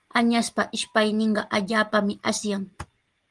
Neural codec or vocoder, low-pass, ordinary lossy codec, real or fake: none; 10.8 kHz; Opus, 24 kbps; real